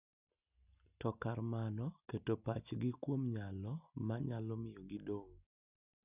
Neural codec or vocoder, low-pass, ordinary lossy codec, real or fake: none; 3.6 kHz; none; real